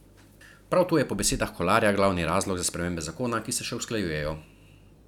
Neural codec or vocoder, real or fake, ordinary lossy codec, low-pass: none; real; none; 19.8 kHz